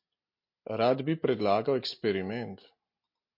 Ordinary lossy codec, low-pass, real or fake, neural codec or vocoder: MP3, 32 kbps; 5.4 kHz; real; none